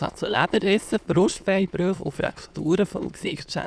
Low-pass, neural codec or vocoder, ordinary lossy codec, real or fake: none; autoencoder, 22.05 kHz, a latent of 192 numbers a frame, VITS, trained on many speakers; none; fake